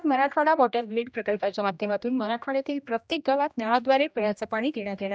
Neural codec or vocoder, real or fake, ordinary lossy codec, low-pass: codec, 16 kHz, 1 kbps, X-Codec, HuBERT features, trained on general audio; fake; none; none